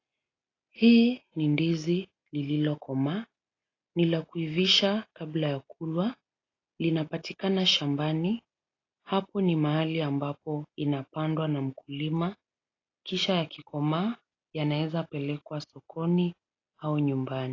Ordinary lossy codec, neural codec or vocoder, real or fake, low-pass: AAC, 32 kbps; none; real; 7.2 kHz